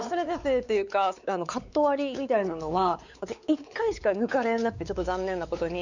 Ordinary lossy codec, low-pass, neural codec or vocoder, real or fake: none; 7.2 kHz; codec, 16 kHz, 4 kbps, X-Codec, WavLM features, trained on Multilingual LibriSpeech; fake